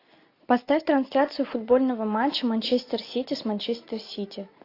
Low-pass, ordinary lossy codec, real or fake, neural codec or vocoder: 5.4 kHz; AAC, 32 kbps; real; none